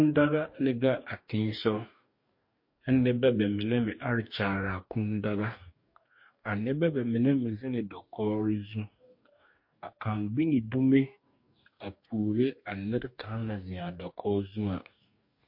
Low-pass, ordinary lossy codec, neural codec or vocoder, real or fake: 5.4 kHz; MP3, 32 kbps; codec, 44.1 kHz, 2.6 kbps, DAC; fake